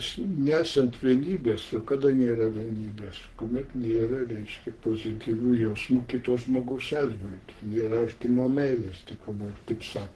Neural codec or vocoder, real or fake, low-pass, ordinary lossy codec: codec, 44.1 kHz, 3.4 kbps, Pupu-Codec; fake; 10.8 kHz; Opus, 16 kbps